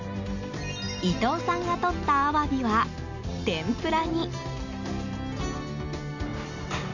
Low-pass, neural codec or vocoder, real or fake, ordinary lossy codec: 7.2 kHz; none; real; none